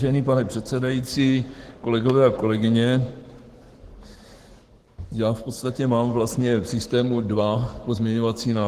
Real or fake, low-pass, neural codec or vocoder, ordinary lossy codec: fake; 14.4 kHz; codec, 44.1 kHz, 7.8 kbps, Pupu-Codec; Opus, 16 kbps